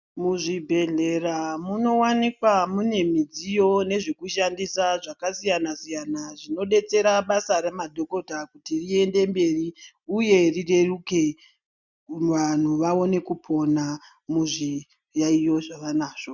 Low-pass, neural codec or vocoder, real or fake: 7.2 kHz; none; real